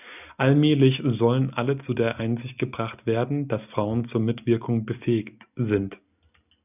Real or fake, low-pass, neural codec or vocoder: real; 3.6 kHz; none